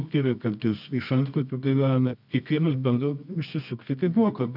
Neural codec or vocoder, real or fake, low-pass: codec, 24 kHz, 0.9 kbps, WavTokenizer, medium music audio release; fake; 5.4 kHz